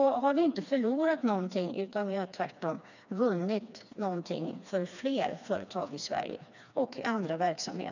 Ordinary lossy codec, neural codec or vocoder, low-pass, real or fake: none; codec, 16 kHz, 2 kbps, FreqCodec, smaller model; 7.2 kHz; fake